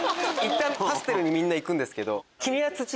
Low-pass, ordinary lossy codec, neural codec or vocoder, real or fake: none; none; none; real